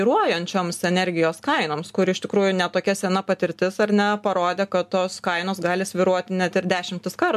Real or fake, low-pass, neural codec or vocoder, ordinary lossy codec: real; 14.4 kHz; none; MP3, 96 kbps